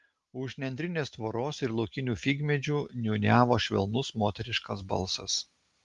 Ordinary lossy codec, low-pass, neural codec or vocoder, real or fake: Opus, 32 kbps; 10.8 kHz; none; real